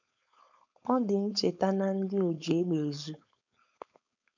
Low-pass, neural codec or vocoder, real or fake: 7.2 kHz; codec, 16 kHz, 4.8 kbps, FACodec; fake